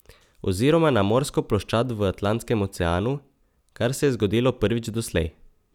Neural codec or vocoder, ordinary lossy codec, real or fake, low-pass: none; none; real; 19.8 kHz